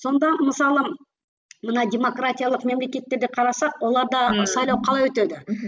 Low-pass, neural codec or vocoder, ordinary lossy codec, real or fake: none; none; none; real